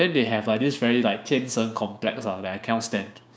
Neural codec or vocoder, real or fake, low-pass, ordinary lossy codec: codec, 16 kHz, 6 kbps, DAC; fake; none; none